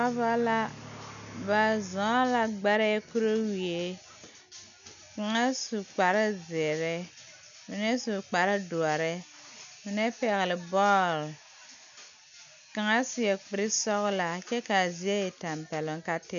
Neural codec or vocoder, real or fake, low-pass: none; real; 7.2 kHz